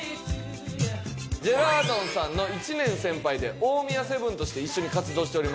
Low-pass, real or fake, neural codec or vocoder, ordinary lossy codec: none; real; none; none